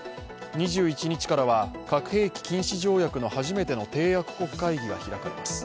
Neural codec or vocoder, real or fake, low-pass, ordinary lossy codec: none; real; none; none